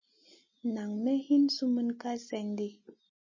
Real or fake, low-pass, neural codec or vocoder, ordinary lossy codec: real; 7.2 kHz; none; MP3, 32 kbps